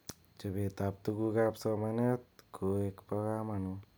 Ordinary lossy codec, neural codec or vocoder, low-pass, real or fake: none; none; none; real